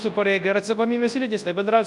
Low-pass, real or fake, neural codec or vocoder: 10.8 kHz; fake; codec, 24 kHz, 0.9 kbps, WavTokenizer, large speech release